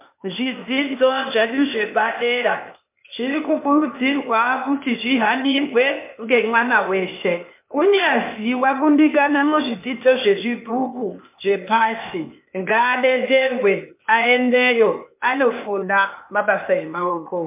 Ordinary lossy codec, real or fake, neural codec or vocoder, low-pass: MP3, 32 kbps; fake; codec, 16 kHz, 0.8 kbps, ZipCodec; 3.6 kHz